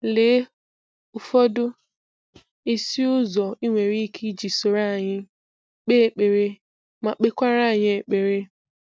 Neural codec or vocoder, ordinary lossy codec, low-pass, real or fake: none; none; none; real